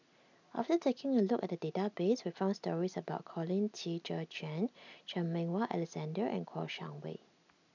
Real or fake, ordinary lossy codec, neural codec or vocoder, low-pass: real; none; none; 7.2 kHz